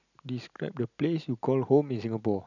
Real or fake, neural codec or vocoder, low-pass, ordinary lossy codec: real; none; 7.2 kHz; none